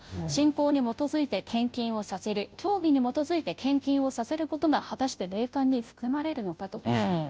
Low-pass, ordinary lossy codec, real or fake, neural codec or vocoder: none; none; fake; codec, 16 kHz, 0.5 kbps, FunCodec, trained on Chinese and English, 25 frames a second